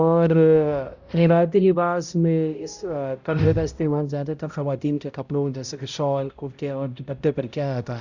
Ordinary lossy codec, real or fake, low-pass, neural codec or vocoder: Opus, 64 kbps; fake; 7.2 kHz; codec, 16 kHz, 0.5 kbps, X-Codec, HuBERT features, trained on balanced general audio